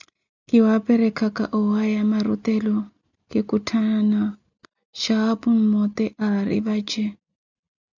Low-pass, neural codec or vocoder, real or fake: 7.2 kHz; none; real